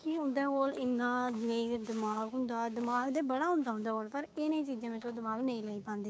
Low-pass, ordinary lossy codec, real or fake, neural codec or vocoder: none; none; fake; codec, 16 kHz, 6 kbps, DAC